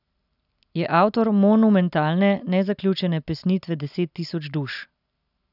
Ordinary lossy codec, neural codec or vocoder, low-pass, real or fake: none; none; 5.4 kHz; real